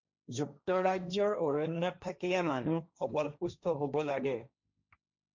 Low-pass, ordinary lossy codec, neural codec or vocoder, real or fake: 7.2 kHz; MP3, 64 kbps; codec, 16 kHz, 1.1 kbps, Voila-Tokenizer; fake